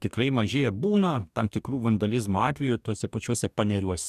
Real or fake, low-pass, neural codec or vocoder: fake; 14.4 kHz; codec, 44.1 kHz, 2.6 kbps, DAC